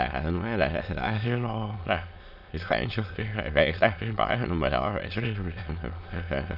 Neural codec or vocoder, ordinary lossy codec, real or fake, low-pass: autoencoder, 22.05 kHz, a latent of 192 numbers a frame, VITS, trained on many speakers; none; fake; 5.4 kHz